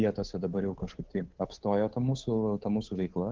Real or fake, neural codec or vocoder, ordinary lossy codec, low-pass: fake; codec, 16 kHz, 8 kbps, FunCodec, trained on Chinese and English, 25 frames a second; Opus, 16 kbps; 7.2 kHz